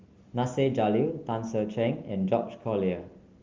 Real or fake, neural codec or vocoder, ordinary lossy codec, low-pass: real; none; Opus, 32 kbps; 7.2 kHz